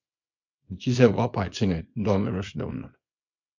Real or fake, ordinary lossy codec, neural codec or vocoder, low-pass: fake; MP3, 64 kbps; codec, 24 kHz, 0.9 kbps, WavTokenizer, small release; 7.2 kHz